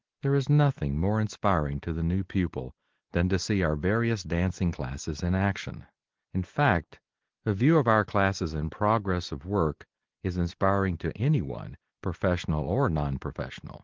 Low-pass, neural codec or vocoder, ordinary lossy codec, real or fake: 7.2 kHz; none; Opus, 16 kbps; real